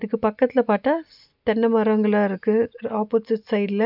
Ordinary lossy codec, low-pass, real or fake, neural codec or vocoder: none; 5.4 kHz; real; none